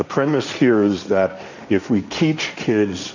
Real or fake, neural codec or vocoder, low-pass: fake; codec, 16 kHz, 1.1 kbps, Voila-Tokenizer; 7.2 kHz